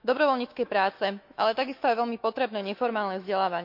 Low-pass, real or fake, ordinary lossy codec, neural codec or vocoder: 5.4 kHz; fake; MP3, 48 kbps; autoencoder, 48 kHz, 128 numbers a frame, DAC-VAE, trained on Japanese speech